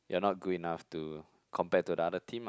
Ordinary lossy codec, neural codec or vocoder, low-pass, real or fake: none; none; none; real